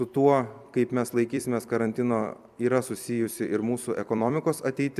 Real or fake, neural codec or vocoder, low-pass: fake; vocoder, 44.1 kHz, 128 mel bands every 256 samples, BigVGAN v2; 14.4 kHz